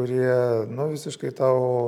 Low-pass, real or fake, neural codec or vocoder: 19.8 kHz; real; none